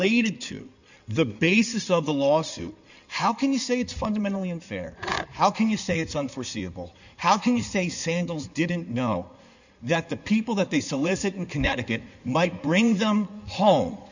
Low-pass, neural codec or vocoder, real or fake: 7.2 kHz; codec, 16 kHz in and 24 kHz out, 2.2 kbps, FireRedTTS-2 codec; fake